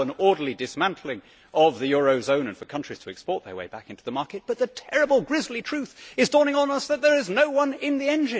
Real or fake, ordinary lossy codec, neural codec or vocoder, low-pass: real; none; none; none